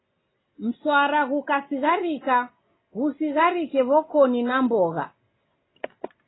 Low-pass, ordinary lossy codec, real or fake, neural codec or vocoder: 7.2 kHz; AAC, 16 kbps; real; none